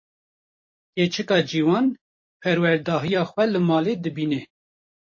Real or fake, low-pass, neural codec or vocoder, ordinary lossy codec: fake; 7.2 kHz; vocoder, 44.1 kHz, 128 mel bands every 256 samples, BigVGAN v2; MP3, 32 kbps